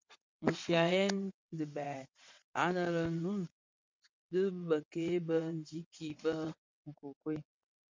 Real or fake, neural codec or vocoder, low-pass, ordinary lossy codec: fake; vocoder, 22.05 kHz, 80 mel bands, WaveNeXt; 7.2 kHz; MP3, 64 kbps